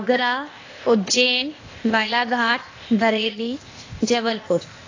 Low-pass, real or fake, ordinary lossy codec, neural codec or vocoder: 7.2 kHz; fake; AAC, 32 kbps; codec, 16 kHz, 0.8 kbps, ZipCodec